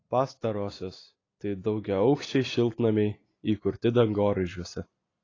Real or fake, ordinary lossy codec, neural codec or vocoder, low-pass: real; AAC, 32 kbps; none; 7.2 kHz